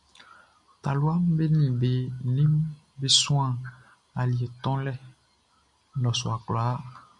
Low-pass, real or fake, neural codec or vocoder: 10.8 kHz; real; none